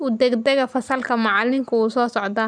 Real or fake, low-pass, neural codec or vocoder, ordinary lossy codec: real; 9.9 kHz; none; none